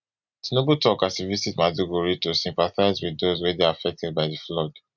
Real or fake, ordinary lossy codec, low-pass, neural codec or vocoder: real; none; 7.2 kHz; none